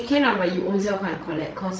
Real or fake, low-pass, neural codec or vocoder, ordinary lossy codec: fake; none; codec, 16 kHz, 16 kbps, FreqCodec, larger model; none